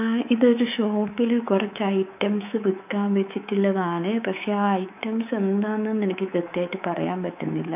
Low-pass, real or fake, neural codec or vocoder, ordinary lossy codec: 3.6 kHz; fake; codec, 24 kHz, 3.1 kbps, DualCodec; none